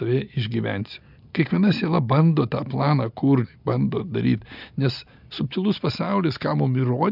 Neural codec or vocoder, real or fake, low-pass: none; real; 5.4 kHz